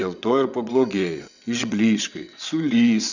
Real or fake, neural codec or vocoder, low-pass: fake; vocoder, 22.05 kHz, 80 mel bands, WaveNeXt; 7.2 kHz